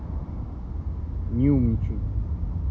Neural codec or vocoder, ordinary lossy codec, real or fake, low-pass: none; none; real; none